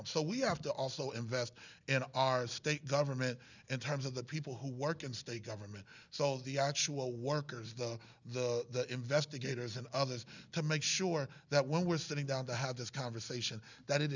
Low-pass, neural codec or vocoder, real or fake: 7.2 kHz; none; real